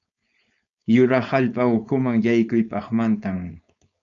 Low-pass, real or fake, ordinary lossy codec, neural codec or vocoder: 7.2 kHz; fake; MP3, 96 kbps; codec, 16 kHz, 4.8 kbps, FACodec